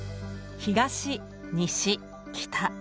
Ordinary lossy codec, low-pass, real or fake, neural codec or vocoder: none; none; real; none